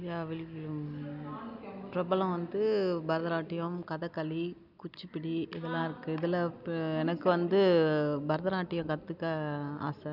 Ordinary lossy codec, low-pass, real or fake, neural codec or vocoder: none; 5.4 kHz; real; none